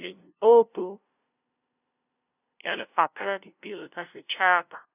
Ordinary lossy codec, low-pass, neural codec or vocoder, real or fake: none; 3.6 kHz; codec, 16 kHz, 0.5 kbps, FunCodec, trained on LibriTTS, 25 frames a second; fake